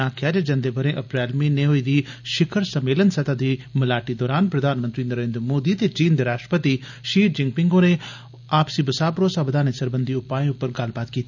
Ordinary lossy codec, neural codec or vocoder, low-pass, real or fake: none; none; 7.2 kHz; real